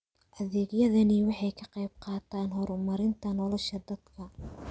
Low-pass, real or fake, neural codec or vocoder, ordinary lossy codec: none; real; none; none